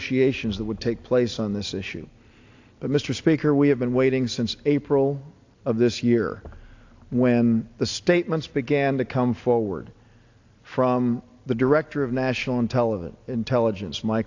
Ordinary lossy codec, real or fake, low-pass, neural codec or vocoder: AAC, 48 kbps; real; 7.2 kHz; none